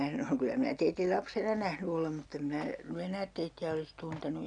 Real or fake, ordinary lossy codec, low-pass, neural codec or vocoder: real; none; 9.9 kHz; none